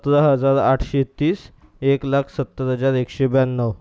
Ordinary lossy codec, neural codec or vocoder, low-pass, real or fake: none; none; none; real